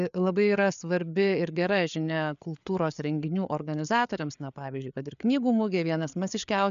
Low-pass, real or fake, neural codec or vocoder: 7.2 kHz; fake; codec, 16 kHz, 4 kbps, FreqCodec, larger model